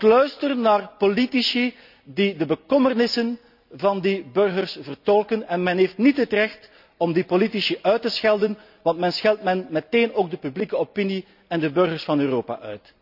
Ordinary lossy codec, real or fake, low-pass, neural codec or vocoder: none; real; 5.4 kHz; none